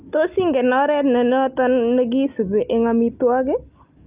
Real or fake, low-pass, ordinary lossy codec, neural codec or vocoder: real; 3.6 kHz; Opus, 24 kbps; none